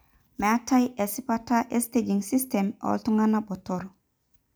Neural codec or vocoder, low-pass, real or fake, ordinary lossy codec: none; none; real; none